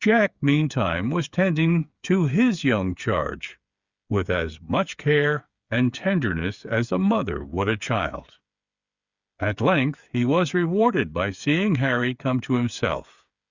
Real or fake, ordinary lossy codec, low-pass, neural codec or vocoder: fake; Opus, 64 kbps; 7.2 kHz; codec, 16 kHz, 8 kbps, FreqCodec, smaller model